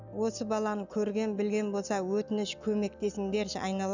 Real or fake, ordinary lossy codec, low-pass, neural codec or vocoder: real; none; 7.2 kHz; none